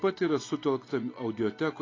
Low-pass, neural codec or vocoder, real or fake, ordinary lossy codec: 7.2 kHz; none; real; AAC, 32 kbps